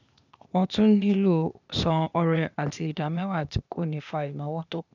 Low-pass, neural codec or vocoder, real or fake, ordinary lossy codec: 7.2 kHz; codec, 16 kHz, 0.8 kbps, ZipCodec; fake; none